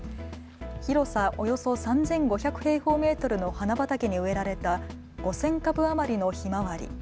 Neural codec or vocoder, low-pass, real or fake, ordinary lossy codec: none; none; real; none